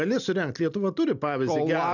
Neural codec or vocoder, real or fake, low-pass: none; real; 7.2 kHz